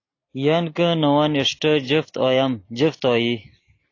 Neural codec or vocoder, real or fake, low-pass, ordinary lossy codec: none; real; 7.2 kHz; AAC, 32 kbps